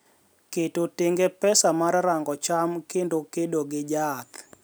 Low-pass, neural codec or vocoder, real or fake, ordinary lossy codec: none; none; real; none